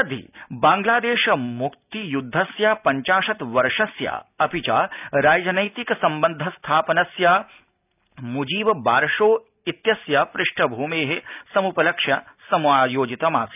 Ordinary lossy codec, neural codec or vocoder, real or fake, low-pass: none; none; real; 3.6 kHz